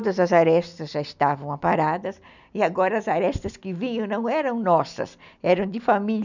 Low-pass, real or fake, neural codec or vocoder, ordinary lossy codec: 7.2 kHz; real; none; none